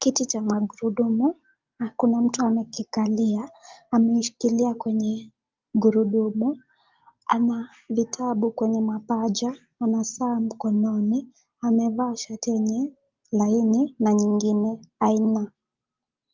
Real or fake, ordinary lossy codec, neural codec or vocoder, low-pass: real; Opus, 32 kbps; none; 7.2 kHz